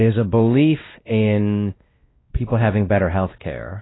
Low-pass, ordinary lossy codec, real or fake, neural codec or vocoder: 7.2 kHz; AAC, 16 kbps; fake; codec, 16 kHz, 1 kbps, X-Codec, WavLM features, trained on Multilingual LibriSpeech